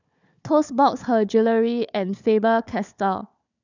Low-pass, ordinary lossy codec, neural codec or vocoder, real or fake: 7.2 kHz; none; codec, 16 kHz, 4 kbps, FunCodec, trained on Chinese and English, 50 frames a second; fake